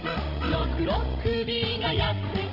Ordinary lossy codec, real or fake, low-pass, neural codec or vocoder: none; fake; 5.4 kHz; codec, 16 kHz, 16 kbps, FreqCodec, larger model